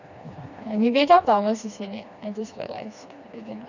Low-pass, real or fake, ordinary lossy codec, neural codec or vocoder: 7.2 kHz; fake; none; codec, 16 kHz, 2 kbps, FreqCodec, smaller model